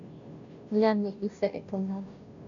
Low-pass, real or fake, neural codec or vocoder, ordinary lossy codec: 7.2 kHz; fake; codec, 16 kHz, 0.5 kbps, FunCodec, trained on Chinese and English, 25 frames a second; MP3, 96 kbps